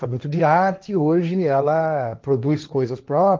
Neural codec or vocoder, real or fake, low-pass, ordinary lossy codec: codec, 16 kHz, 1.1 kbps, Voila-Tokenizer; fake; 7.2 kHz; Opus, 32 kbps